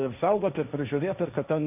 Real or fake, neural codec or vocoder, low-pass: fake; codec, 16 kHz, 1.1 kbps, Voila-Tokenizer; 3.6 kHz